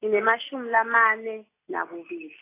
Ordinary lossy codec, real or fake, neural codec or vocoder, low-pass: AAC, 24 kbps; real; none; 3.6 kHz